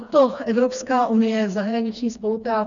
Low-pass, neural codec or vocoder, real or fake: 7.2 kHz; codec, 16 kHz, 2 kbps, FreqCodec, smaller model; fake